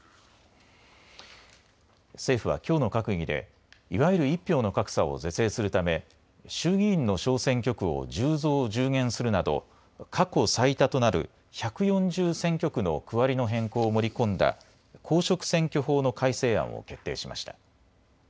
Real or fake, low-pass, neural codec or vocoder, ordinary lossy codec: real; none; none; none